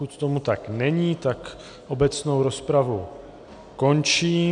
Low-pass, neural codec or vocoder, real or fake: 9.9 kHz; none; real